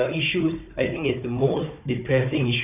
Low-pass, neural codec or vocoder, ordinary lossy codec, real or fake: 3.6 kHz; codec, 16 kHz, 4 kbps, FunCodec, trained on Chinese and English, 50 frames a second; none; fake